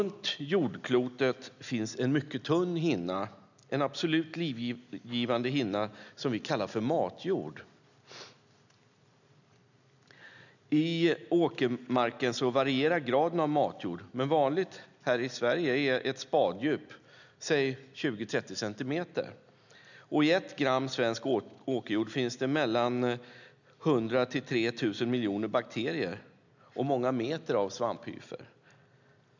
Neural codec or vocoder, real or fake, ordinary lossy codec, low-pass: none; real; none; 7.2 kHz